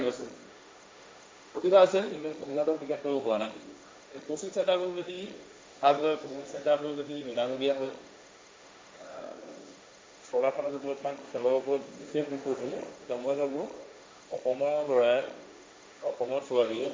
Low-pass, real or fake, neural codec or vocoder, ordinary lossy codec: none; fake; codec, 16 kHz, 1.1 kbps, Voila-Tokenizer; none